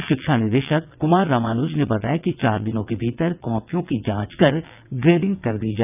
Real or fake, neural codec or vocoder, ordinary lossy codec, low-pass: fake; vocoder, 22.05 kHz, 80 mel bands, WaveNeXt; none; 3.6 kHz